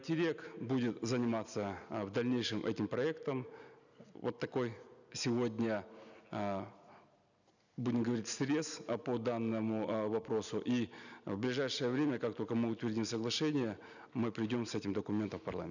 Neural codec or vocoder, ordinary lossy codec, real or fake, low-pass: none; none; real; 7.2 kHz